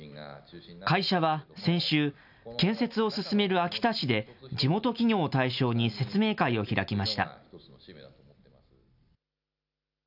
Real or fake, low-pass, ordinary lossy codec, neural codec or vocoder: real; 5.4 kHz; none; none